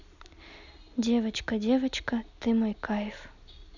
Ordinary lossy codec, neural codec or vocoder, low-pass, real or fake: none; none; 7.2 kHz; real